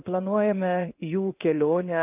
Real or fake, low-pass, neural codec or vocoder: fake; 3.6 kHz; codec, 16 kHz in and 24 kHz out, 1 kbps, XY-Tokenizer